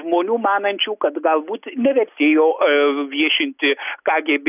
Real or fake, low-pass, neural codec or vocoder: real; 3.6 kHz; none